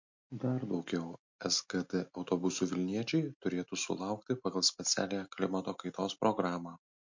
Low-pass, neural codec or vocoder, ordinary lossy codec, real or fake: 7.2 kHz; none; MP3, 48 kbps; real